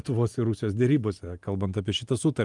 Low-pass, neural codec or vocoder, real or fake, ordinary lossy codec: 10.8 kHz; none; real; Opus, 32 kbps